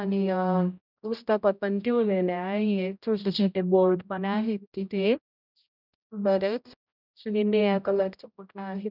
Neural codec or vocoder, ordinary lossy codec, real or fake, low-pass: codec, 16 kHz, 0.5 kbps, X-Codec, HuBERT features, trained on general audio; none; fake; 5.4 kHz